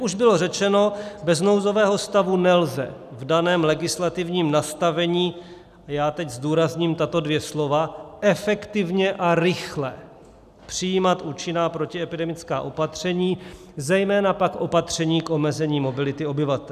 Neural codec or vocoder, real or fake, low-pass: none; real; 14.4 kHz